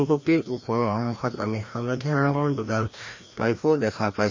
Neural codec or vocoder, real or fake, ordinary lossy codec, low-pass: codec, 16 kHz, 1 kbps, FreqCodec, larger model; fake; MP3, 32 kbps; 7.2 kHz